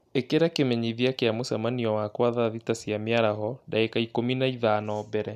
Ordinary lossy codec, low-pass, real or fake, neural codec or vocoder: none; 14.4 kHz; real; none